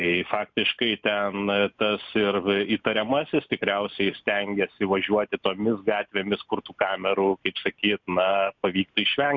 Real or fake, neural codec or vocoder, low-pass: real; none; 7.2 kHz